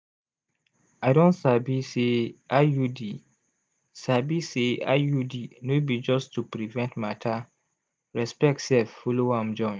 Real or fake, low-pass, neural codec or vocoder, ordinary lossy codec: real; none; none; none